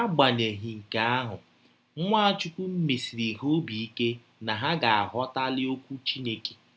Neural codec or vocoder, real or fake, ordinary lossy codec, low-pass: none; real; none; none